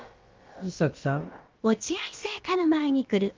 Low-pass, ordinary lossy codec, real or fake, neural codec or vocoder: 7.2 kHz; Opus, 24 kbps; fake; codec, 16 kHz, about 1 kbps, DyCAST, with the encoder's durations